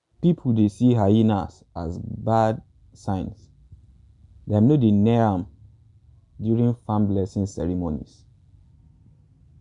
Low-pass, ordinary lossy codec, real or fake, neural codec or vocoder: 10.8 kHz; none; real; none